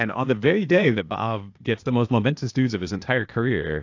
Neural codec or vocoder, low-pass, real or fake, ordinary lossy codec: codec, 16 kHz, 0.8 kbps, ZipCodec; 7.2 kHz; fake; AAC, 48 kbps